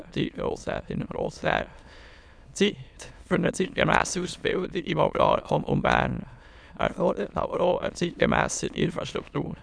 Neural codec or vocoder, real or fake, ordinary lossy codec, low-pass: autoencoder, 22.05 kHz, a latent of 192 numbers a frame, VITS, trained on many speakers; fake; none; none